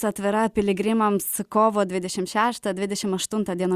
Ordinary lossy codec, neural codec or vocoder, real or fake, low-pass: Opus, 64 kbps; none; real; 14.4 kHz